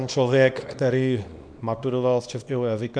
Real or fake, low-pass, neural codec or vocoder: fake; 9.9 kHz; codec, 24 kHz, 0.9 kbps, WavTokenizer, small release